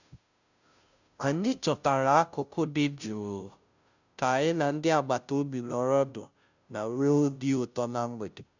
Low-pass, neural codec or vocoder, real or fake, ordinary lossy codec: 7.2 kHz; codec, 16 kHz, 0.5 kbps, FunCodec, trained on Chinese and English, 25 frames a second; fake; none